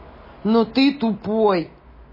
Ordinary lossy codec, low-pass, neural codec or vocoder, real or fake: MP3, 24 kbps; 5.4 kHz; none; real